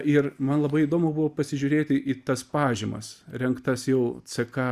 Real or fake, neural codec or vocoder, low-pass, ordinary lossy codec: real; none; 14.4 kHz; Opus, 64 kbps